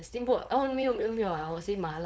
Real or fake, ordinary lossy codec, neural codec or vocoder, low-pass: fake; none; codec, 16 kHz, 4.8 kbps, FACodec; none